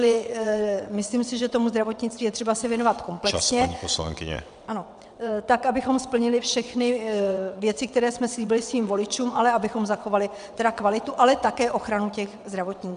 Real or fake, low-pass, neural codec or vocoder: fake; 9.9 kHz; vocoder, 22.05 kHz, 80 mel bands, WaveNeXt